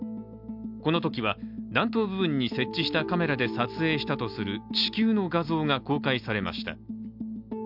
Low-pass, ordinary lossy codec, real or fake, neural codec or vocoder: 5.4 kHz; none; real; none